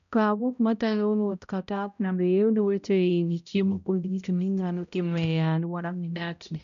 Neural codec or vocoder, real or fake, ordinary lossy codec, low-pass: codec, 16 kHz, 0.5 kbps, X-Codec, HuBERT features, trained on balanced general audio; fake; none; 7.2 kHz